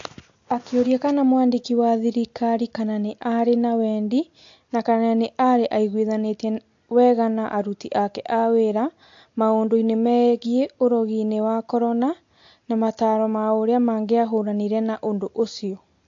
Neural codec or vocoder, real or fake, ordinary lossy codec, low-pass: none; real; AAC, 48 kbps; 7.2 kHz